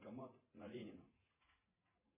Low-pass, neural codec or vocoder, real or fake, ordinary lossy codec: 3.6 kHz; vocoder, 44.1 kHz, 80 mel bands, Vocos; fake; MP3, 16 kbps